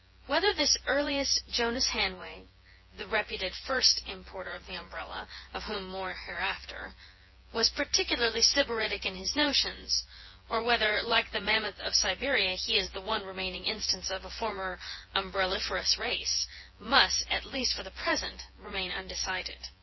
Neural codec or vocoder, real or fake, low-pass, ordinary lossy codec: vocoder, 24 kHz, 100 mel bands, Vocos; fake; 7.2 kHz; MP3, 24 kbps